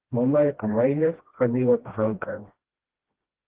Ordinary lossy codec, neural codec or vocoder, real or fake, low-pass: Opus, 16 kbps; codec, 16 kHz, 1 kbps, FreqCodec, smaller model; fake; 3.6 kHz